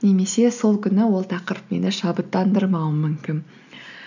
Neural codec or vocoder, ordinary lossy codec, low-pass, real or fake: none; none; 7.2 kHz; real